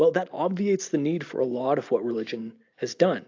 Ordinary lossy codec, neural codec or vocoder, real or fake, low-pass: AAC, 48 kbps; none; real; 7.2 kHz